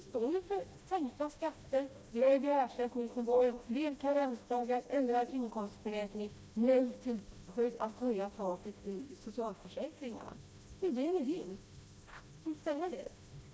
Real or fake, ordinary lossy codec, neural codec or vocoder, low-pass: fake; none; codec, 16 kHz, 1 kbps, FreqCodec, smaller model; none